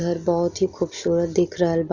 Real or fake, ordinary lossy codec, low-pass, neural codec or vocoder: real; none; 7.2 kHz; none